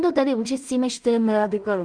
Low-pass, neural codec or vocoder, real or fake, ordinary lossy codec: 9.9 kHz; codec, 16 kHz in and 24 kHz out, 0.4 kbps, LongCat-Audio-Codec, two codebook decoder; fake; Opus, 32 kbps